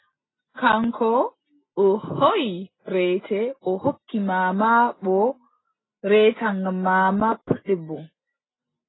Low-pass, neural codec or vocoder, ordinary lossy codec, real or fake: 7.2 kHz; none; AAC, 16 kbps; real